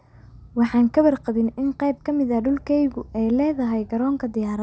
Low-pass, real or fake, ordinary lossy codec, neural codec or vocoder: none; real; none; none